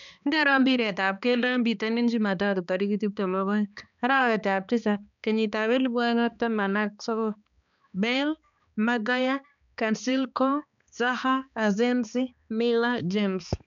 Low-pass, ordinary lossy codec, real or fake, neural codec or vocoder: 7.2 kHz; none; fake; codec, 16 kHz, 2 kbps, X-Codec, HuBERT features, trained on balanced general audio